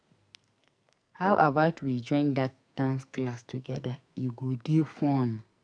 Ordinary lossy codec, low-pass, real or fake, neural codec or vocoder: none; 9.9 kHz; fake; codec, 44.1 kHz, 2.6 kbps, SNAC